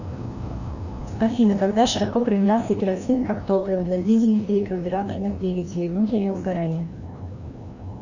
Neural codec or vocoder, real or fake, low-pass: codec, 16 kHz, 1 kbps, FreqCodec, larger model; fake; 7.2 kHz